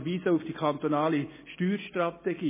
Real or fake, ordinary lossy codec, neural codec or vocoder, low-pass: real; MP3, 16 kbps; none; 3.6 kHz